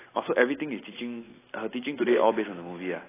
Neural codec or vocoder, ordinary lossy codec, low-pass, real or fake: none; AAC, 16 kbps; 3.6 kHz; real